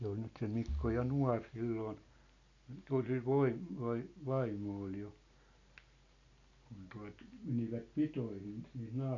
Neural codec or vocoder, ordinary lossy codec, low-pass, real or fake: none; none; 7.2 kHz; real